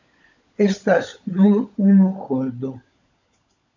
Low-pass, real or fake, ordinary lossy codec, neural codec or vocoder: 7.2 kHz; fake; AAC, 48 kbps; codec, 16 kHz, 16 kbps, FunCodec, trained on LibriTTS, 50 frames a second